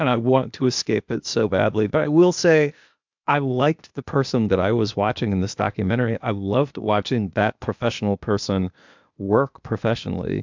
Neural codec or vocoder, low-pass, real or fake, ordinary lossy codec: codec, 16 kHz, 0.8 kbps, ZipCodec; 7.2 kHz; fake; MP3, 64 kbps